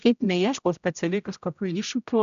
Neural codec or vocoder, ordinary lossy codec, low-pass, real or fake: codec, 16 kHz, 0.5 kbps, X-Codec, HuBERT features, trained on general audio; AAC, 96 kbps; 7.2 kHz; fake